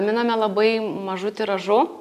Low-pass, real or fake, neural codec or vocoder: 14.4 kHz; real; none